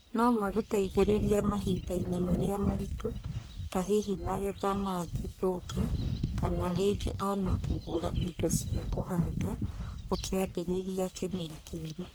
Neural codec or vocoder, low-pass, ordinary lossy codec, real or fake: codec, 44.1 kHz, 1.7 kbps, Pupu-Codec; none; none; fake